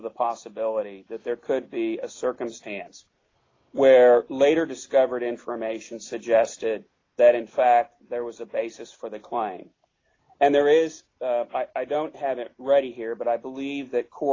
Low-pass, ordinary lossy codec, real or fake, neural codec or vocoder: 7.2 kHz; AAC, 32 kbps; real; none